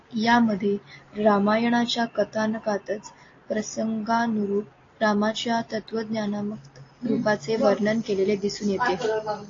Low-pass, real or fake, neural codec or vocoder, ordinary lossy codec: 7.2 kHz; real; none; AAC, 32 kbps